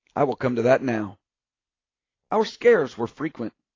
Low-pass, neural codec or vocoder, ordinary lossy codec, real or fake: 7.2 kHz; none; AAC, 32 kbps; real